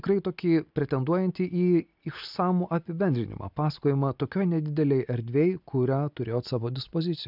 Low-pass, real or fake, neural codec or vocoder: 5.4 kHz; real; none